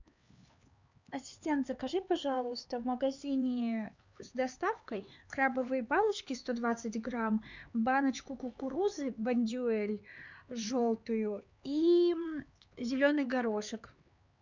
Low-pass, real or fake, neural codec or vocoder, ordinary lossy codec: 7.2 kHz; fake; codec, 16 kHz, 4 kbps, X-Codec, HuBERT features, trained on LibriSpeech; Opus, 64 kbps